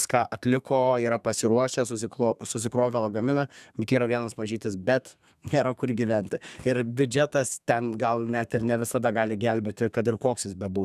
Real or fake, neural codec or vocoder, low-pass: fake; codec, 32 kHz, 1.9 kbps, SNAC; 14.4 kHz